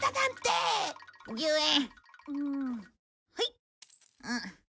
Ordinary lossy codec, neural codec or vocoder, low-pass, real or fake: none; none; none; real